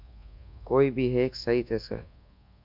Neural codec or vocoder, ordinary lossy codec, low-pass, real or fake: codec, 24 kHz, 1.2 kbps, DualCodec; MP3, 48 kbps; 5.4 kHz; fake